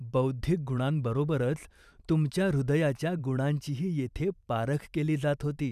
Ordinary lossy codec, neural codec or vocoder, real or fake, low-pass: none; vocoder, 44.1 kHz, 128 mel bands every 512 samples, BigVGAN v2; fake; 14.4 kHz